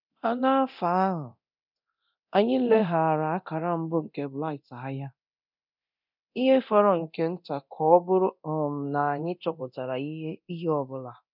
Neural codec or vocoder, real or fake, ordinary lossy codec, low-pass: codec, 24 kHz, 0.9 kbps, DualCodec; fake; none; 5.4 kHz